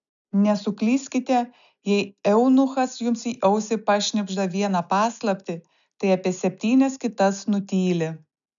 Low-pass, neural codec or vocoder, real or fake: 7.2 kHz; none; real